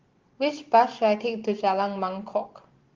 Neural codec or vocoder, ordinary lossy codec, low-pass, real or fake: none; Opus, 16 kbps; 7.2 kHz; real